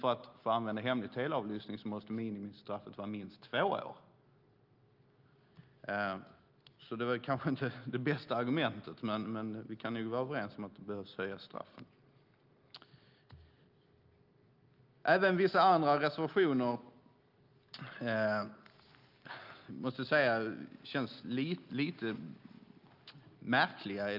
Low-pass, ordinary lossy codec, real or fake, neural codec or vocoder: 5.4 kHz; Opus, 32 kbps; real; none